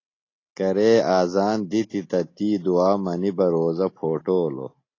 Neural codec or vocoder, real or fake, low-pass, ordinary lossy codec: none; real; 7.2 kHz; AAC, 32 kbps